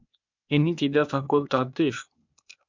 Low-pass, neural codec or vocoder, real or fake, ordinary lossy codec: 7.2 kHz; codec, 16 kHz, 0.8 kbps, ZipCodec; fake; MP3, 64 kbps